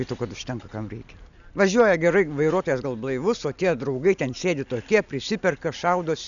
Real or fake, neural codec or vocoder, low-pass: real; none; 7.2 kHz